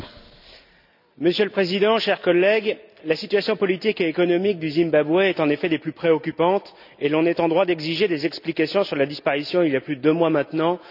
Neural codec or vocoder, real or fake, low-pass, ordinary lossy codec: none; real; 5.4 kHz; none